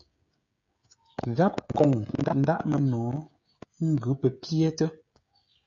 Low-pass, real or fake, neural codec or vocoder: 7.2 kHz; fake; codec, 16 kHz, 16 kbps, FreqCodec, smaller model